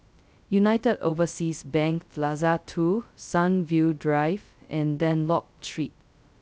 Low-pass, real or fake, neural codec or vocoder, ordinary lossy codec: none; fake; codec, 16 kHz, 0.2 kbps, FocalCodec; none